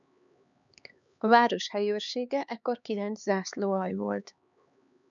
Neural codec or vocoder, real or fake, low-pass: codec, 16 kHz, 4 kbps, X-Codec, HuBERT features, trained on LibriSpeech; fake; 7.2 kHz